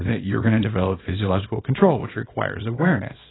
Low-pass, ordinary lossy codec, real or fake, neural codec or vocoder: 7.2 kHz; AAC, 16 kbps; fake; codec, 24 kHz, 0.9 kbps, WavTokenizer, small release